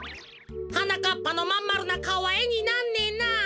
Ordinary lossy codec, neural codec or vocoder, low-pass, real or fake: none; none; none; real